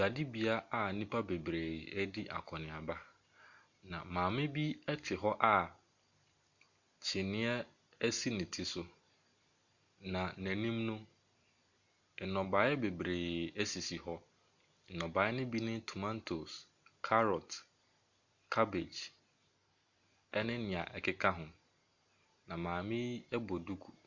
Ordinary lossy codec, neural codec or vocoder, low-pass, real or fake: Opus, 64 kbps; none; 7.2 kHz; real